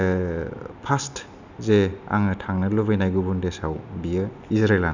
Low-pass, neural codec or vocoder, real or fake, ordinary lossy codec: 7.2 kHz; none; real; none